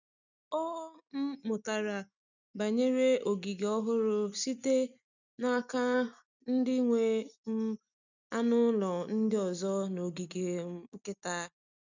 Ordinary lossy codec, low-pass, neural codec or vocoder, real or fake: none; 7.2 kHz; none; real